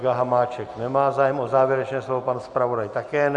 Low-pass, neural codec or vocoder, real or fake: 10.8 kHz; none; real